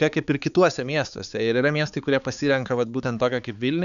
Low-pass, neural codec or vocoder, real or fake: 7.2 kHz; codec, 16 kHz, 4 kbps, X-Codec, HuBERT features, trained on balanced general audio; fake